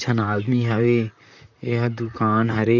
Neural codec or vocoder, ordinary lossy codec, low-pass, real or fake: vocoder, 44.1 kHz, 128 mel bands, Pupu-Vocoder; none; 7.2 kHz; fake